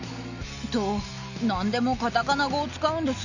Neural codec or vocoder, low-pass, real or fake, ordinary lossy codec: none; 7.2 kHz; real; none